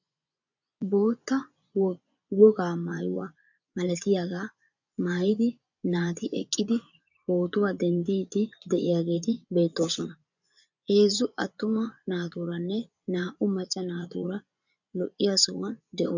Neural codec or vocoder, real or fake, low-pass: vocoder, 24 kHz, 100 mel bands, Vocos; fake; 7.2 kHz